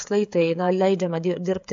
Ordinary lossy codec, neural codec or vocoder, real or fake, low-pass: AAC, 64 kbps; codec, 16 kHz, 16 kbps, FreqCodec, smaller model; fake; 7.2 kHz